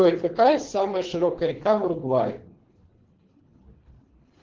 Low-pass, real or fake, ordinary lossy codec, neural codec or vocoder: 7.2 kHz; fake; Opus, 16 kbps; codec, 24 kHz, 3 kbps, HILCodec